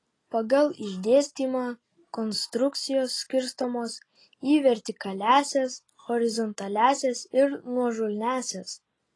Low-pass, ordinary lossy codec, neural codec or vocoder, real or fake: 10.8 kHz; AAC, 32 kbps; none; real